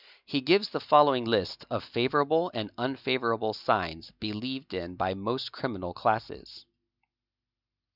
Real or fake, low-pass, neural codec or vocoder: real; 5.4 kHz; none